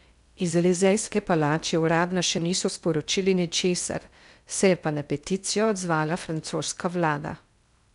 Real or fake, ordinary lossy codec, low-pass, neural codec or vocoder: fake; none; 10.8 kHz; codec, 16 kHz in and 24 kHz out, 0.6 kbps, FocalCodec, streaming, 2048 codes